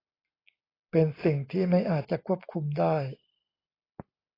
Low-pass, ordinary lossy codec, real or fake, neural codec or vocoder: 5.4 kHz; AAC, 24 kbps; real; none